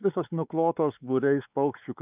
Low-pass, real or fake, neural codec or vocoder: 3.6 kHz; fake; codec, 16 kHz, 4 kbps, X-Codec, WavLM features, trained on Multilingual LibriSpeech